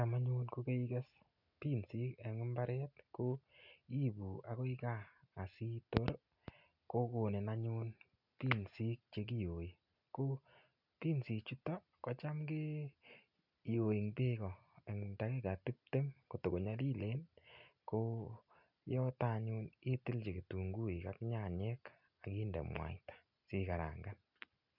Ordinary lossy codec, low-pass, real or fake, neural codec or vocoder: none; 5.4 kHz; real; none